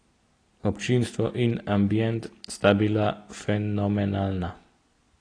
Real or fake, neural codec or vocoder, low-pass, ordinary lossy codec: real; none; 9.9 kHz; AAC, 32 kbps